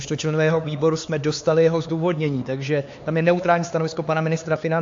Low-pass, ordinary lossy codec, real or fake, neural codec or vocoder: 7.2 kHz; AAC, 48 kbps; fake; codec, 16 kHz, 4 kbps, X-Codec, HuBERT features, trained on LibriSpeech